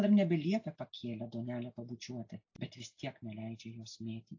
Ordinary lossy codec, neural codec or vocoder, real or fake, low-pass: AAC, 48 kbps; none; real; 7.2 kHz